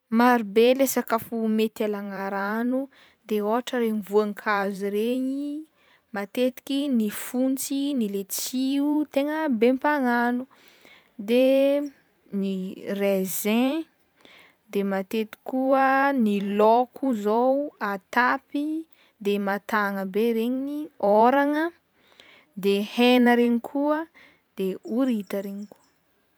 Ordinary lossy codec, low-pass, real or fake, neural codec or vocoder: none; none; fake; vocoder, 44.1 kHz, 128 mel bands every 512 samples, BigVGAN v2